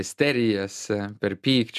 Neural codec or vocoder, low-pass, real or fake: none; 14.4 kHz; real